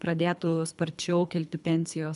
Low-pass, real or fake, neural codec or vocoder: 10.8 kHz; fake; codec, 24 kHz, 3 kbps, HILCodec